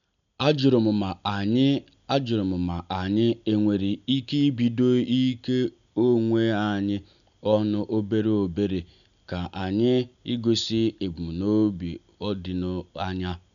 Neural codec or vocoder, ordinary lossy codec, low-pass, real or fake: none; none; 7.2 kHz; real